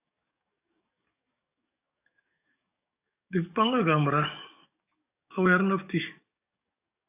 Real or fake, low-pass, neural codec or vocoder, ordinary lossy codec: fake; 3.6 kHz; codec, 44.1 kHz, 7.8 kbps, DAC; AAC, 32 kbps